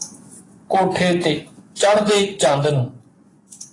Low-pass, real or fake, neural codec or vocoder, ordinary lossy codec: 10.8 kHz; fake; vocoder, 24 kHz, 100 mel bands, Vocos; AAC, 32 kbps